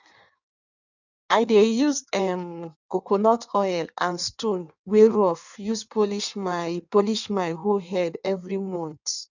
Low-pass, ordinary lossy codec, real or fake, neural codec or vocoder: 7.2 kHz; none; fake; codec, 16 kHz in and 24 kHz out, 1.1 kbps, FireRedTTS-2 codec